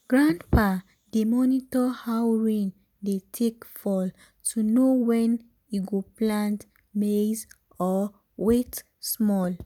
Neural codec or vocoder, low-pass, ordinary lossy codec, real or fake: none; 19.8 kHz; none; real